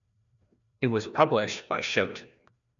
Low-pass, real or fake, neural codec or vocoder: 7.2 kHz; fake; codec, 16 kHz, 1 kbps, FreqCodec, larger model